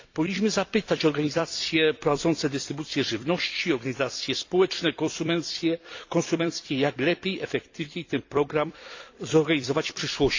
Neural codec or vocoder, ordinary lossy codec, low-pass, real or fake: vocoder, 44.1 kHz, 128 mel bands every 256 samples, BigVGAN v2; AAC, 48 kbps; 7.2 kHz; fake